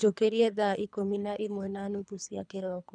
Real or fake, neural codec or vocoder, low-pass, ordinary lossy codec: fake; codec, 24 kHz, 3 kbps, HILCodec; 9.9 kHz; none